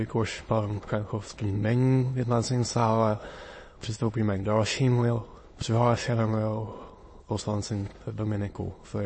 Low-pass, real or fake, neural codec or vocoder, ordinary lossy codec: 9.9 kHz; fake; autoencoder, 22.05 kHz, a latent of 192 numbers a frame, VITS, trained on many speakers; MP3, 32 kbps